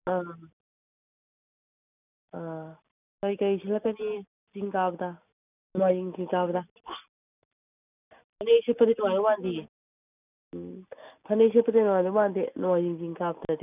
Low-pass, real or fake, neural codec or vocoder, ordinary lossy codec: 3.6 kHz; real; none; none